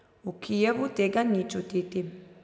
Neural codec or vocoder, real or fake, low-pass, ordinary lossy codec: none; real; none; none